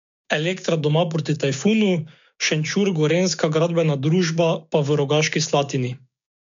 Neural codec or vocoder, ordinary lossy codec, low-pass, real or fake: codec, 16 kHz, 6 kbps, DAC; MP3, 64 kbps; 7.2 kHz; fake